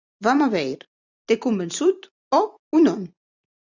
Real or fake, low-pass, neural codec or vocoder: fake; 7.2 kHz; vocoder, 44.1 kHz, 128 mel bands every 256 samples, BigVGAN v2